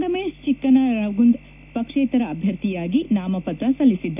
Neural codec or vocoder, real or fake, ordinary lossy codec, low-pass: none; real; none; 3.6 kHz